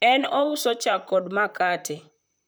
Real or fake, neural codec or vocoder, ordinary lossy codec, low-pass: fake; vocoder, 44.1 kHz, 128 mel bands, Pupu-Vocoder; none; none